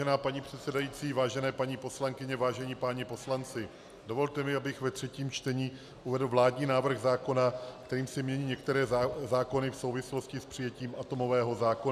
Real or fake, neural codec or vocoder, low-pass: real; none; 14.4 kHz